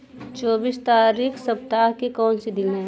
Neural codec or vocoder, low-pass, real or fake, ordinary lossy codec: none; none; real; none